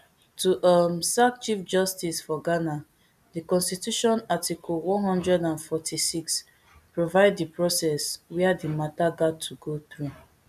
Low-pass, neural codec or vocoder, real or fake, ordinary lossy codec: 14.4 kHz; none; real; none